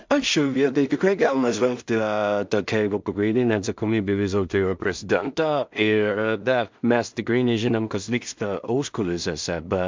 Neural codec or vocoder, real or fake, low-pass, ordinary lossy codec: codec, 16 kHz in and 24 kHz out, 0.4 kbps, LongCat-Audio-Codec, two codebook decoder; fake; 7.2 kHz; MP3, 64 kbps